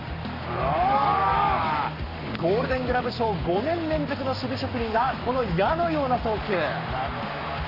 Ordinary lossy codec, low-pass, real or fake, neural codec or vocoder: none; 5.4 kHz; fake; codec, 44.1 kHz, 7.8 kbps, Pupu-Codec